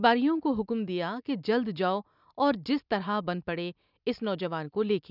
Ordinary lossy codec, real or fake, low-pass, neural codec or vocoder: none; real; 5.4 kHz; none